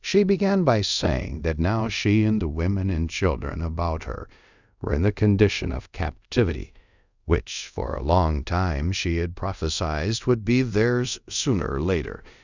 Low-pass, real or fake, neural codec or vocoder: 7.2 kHz; fake; codec, 24 kHz, 0.5 kbps, DualCodec